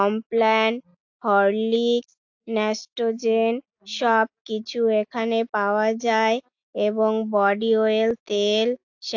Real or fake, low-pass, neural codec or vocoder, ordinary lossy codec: real; 7.2 kHz; none; AAC, 48 kbps